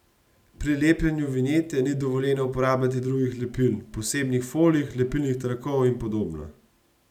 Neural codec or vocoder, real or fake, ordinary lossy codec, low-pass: none; real; none; 19.8 kHz